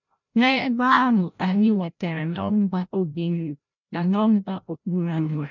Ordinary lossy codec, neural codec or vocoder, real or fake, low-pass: none; codec, 16 kHz, 0.5 kbps, FreqCodec, larger model; fake; 7.2 kHz